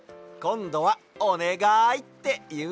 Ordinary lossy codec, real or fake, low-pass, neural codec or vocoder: none; real; none; none